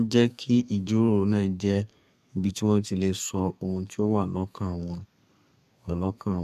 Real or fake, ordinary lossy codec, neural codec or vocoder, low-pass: fake; MP3, 96 kbps; codec, 32 kHz, 1.9 kbps, SNAC; 14.4 kHz